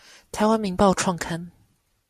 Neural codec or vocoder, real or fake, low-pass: none; real; 14.4 kHz